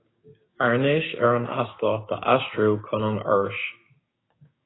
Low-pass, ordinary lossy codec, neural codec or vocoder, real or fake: 7.2 kHz; AAC, 16 kbps; codec, 16 kHz, 6 kbps, DAC; fake